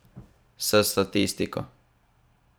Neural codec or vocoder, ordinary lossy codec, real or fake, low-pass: vocoder, 44.1 kHz, 128 mel bands every 512 samples, BigVGAN v2; none; fake; none